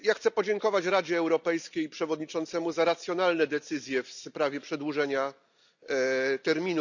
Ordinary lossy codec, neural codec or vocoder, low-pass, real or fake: none; none; 7.2 kHz; real